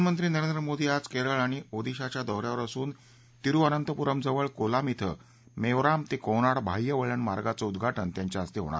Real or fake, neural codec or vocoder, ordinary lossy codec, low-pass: real; none; none; none